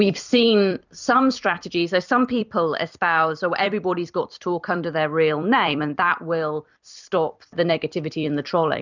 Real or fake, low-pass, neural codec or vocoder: fake; 7.2 kHz; vocoder, 44.1 kHz, 128 mel bands every 256 samples, BigVGAN v2